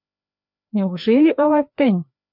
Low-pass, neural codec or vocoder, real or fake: 5.4 kHz; codec, 16 kHz, 2 kbps, FreqCodec, larger model; fake